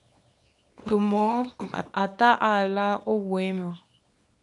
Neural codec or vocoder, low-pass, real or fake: codec, 24 kHz, 0.9 kbps, WavTokenizer, small release; 10.8 kHz; fake